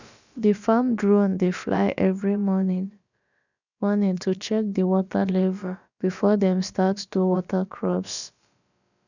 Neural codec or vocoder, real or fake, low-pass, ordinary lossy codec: codec, 16 kHz, about 1 kbps, DyCAST, with the encoder's durations; fake; 7.2 kHz; none